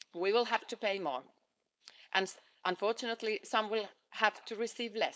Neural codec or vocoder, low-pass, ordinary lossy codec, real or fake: codec, 16 kHz, 4.8 kbps, FACodec; none; none; fake